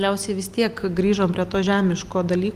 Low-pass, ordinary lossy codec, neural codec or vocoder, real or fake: 14.4 kHz; Opus, 24 kbps; none; real